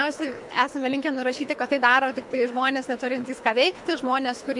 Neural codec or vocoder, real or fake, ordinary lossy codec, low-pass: codec, 24 kHz, 3 kbps, HILCodec; fake; MP3, 64 kbps; 10.8 kHz